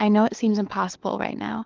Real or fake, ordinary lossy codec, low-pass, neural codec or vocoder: fake; Opus, 32 kbps; 7.2 kHz; codec, 16 kHz, 4 kbps, FunCodec, trained on LibriTTS, 50 frames a second